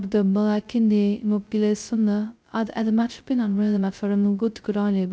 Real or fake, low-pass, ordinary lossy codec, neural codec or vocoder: fake; none; none; codec, 16 kHz, 0.2 kbps, FocalCodec